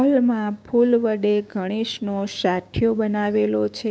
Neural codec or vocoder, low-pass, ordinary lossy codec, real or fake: codec, 16 kHz, 6 kbps, DAC; none; none; fake